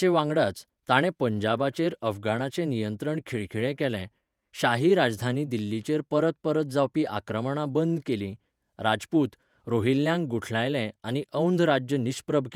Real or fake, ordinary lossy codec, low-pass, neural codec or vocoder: fake; none; 19.8 kHz; vocoder, 48 kHz, 128 mel bands, Vocos